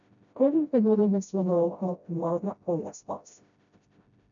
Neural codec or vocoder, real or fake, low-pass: codec, 16 kHz, 0.5 kbps, FreqCodec, smaller model; fake; 7.2 kHz